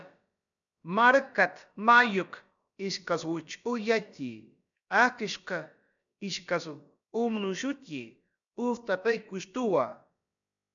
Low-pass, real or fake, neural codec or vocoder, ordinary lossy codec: 7.2 kHz; fake; codec, 16 kHz, about 1 kbps, DyCAST, with the encoder's durations; MP3, 96 kbps